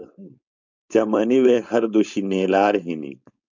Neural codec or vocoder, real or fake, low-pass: codec, 16 kHz, 4.8 kbps, FACodec; fake; 7.2 kHz